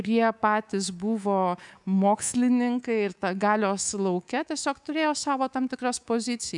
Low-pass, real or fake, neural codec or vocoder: 10.8 kHz; fake; codec, 24 kHz, 3.1 kbps, DualCodec